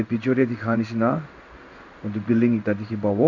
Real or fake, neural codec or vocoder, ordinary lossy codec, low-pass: fake; codec, 16 kHz in and 24 kHz out, 1 kbps, XY-Tokenizer; none; 7.2 kHz